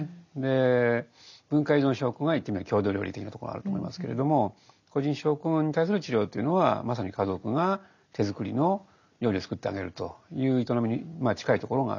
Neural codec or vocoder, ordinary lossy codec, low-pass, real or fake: none; none; 7.2 kHz; real